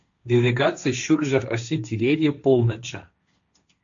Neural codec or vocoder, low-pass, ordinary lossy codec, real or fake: codec, 16 kHz, 1.1 kbps, Voila-Tokenizer; 7.2 kHz; MP3, 64 kbps; fake